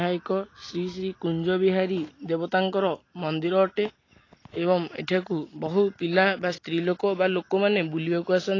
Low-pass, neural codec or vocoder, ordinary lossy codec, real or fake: 7.2 kHz; none; AAC, 32 kbps; real